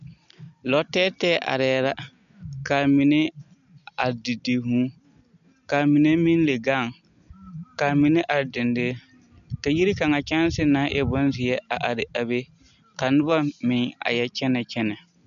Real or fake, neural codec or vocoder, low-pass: real; none; 7.2 kHz